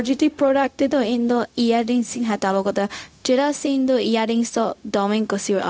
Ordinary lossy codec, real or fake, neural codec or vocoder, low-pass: none; fake; codec, 16 kHz, 0.4 kbps, LongCat-Audio-Codec; none